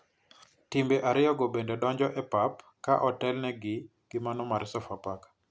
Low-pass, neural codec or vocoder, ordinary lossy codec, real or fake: none; none; none; real